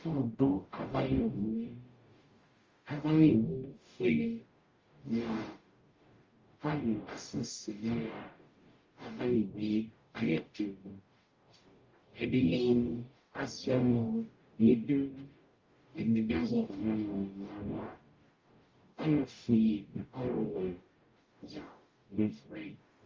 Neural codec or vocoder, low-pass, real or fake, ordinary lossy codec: codec, 44.1 kHz, 0.9 kbps, DAC; 7.2 kHz; fake; Opus, 32 kbps